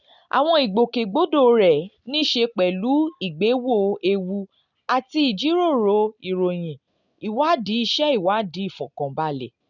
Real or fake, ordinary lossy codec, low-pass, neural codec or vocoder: real; none; 7.2 kHz; none